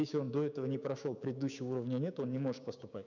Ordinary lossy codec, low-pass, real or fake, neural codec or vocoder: none; 7.2 kHz; fake; codec, 16 kHz, 6 kbps, DAC